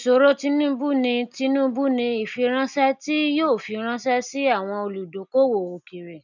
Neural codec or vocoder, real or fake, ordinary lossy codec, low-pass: none; real; none; 7.2 kHz